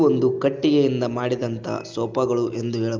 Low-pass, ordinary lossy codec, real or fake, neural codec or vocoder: 7.2 kHz; Opus, 32 kbps; real; none